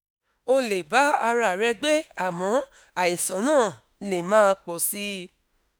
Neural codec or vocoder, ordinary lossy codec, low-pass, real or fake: autoencoder, 48 kHz, 32 numbers a frame, DAC-VAE, trained on Japanese speech; none; none; fake